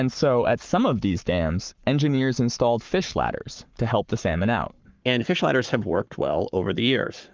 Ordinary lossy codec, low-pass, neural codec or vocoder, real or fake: Opus, 24 kbps; 7.2 kHz; codec, 44.1 kHz, 7.8 kbps, Pupu-Codec; fake